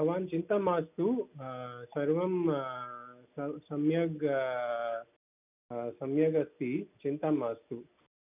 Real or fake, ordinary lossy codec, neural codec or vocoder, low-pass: real; none; none; 3.6 kHz